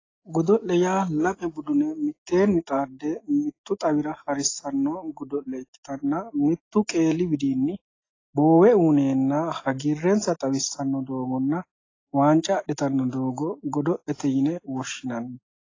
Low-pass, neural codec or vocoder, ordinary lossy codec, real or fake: 7.2 kHz; none; AAC, 32 kbps; real